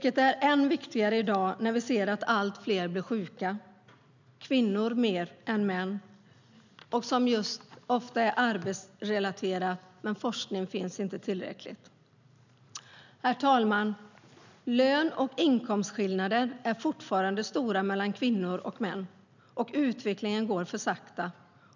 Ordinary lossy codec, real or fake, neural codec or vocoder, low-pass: none; real; none; 7.2 kHz